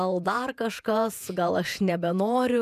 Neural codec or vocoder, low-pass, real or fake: vocoder, 48 kHz, 128 mel bands, Vocos; 14.4 kHz; fake